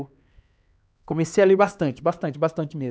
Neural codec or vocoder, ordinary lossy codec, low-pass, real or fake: codec, 16 kHz, 2 kbps, X-Codec, HuBERT features, trained on LibriSpeech; none; none; fake